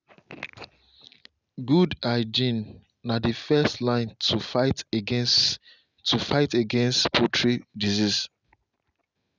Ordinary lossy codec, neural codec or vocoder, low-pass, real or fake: none; none; 7.2 kHz; real